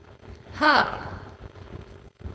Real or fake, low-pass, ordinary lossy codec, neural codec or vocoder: fake; none; none; codec, 16 kHz, 4.8 kbps, FACodec